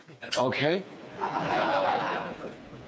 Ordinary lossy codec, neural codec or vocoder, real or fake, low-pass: none; codec, 16 kHz, 4 kbps, FreqCodec, smaller model; fake; none